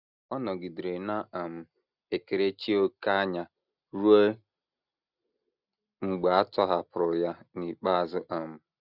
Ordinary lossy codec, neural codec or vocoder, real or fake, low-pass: none; none; real; 5.4 kHz